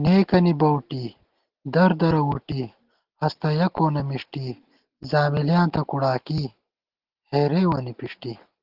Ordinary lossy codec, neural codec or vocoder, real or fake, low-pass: Opus, 16 kbps; none; real; 5.4 kHz